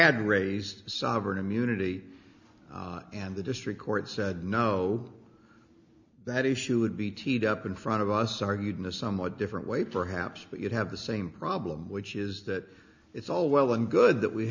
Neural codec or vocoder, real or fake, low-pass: none; real; 7.2 kHz